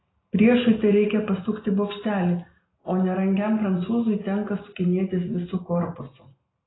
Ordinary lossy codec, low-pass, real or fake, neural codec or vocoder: AAC, 16 kbps; 7.2 kHz; real; none